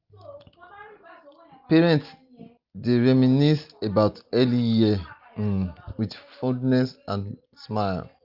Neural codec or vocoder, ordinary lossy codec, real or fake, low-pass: none; Opus, 32 kbps; real; 5.4 kHz